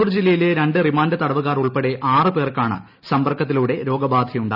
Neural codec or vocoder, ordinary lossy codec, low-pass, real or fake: none; none; 5.4 kHz; real